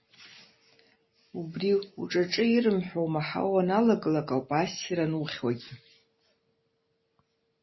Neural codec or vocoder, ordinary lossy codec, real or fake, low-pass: none; MP3, 24 kbps; real; 7.2 kHz